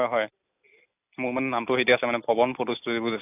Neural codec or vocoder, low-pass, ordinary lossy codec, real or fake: none; 3.6 kHz; AAC, 32 kbps; real